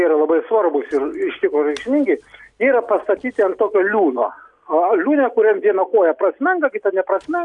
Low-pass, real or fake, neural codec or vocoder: 10.8 kHz; real; none